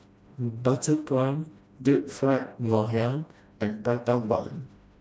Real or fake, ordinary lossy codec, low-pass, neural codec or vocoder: fake; none; none; codec, 16 kHz, 1 kbps, FreqCodec, smaller model